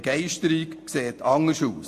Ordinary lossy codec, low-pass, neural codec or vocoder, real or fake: AAC, 64 kbps; 14.4 kHz; none; real